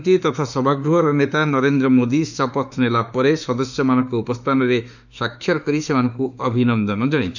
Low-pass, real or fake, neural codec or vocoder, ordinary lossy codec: 7.2 kHz; fake; autoencoder, 48 kHz, 32 numbers a frame, DAC-VAE, trained on Japanese speech; none